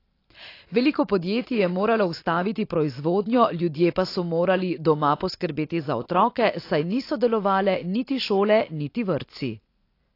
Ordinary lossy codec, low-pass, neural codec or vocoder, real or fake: AAC, 32 kbps; 5.4 kHz; none; real